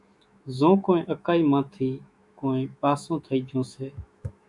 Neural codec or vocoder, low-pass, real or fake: autoencoder, 48 kHz, 128 numbers a frame, DAC-VAE, trained on Japanese speech; 10.8 kHz; fake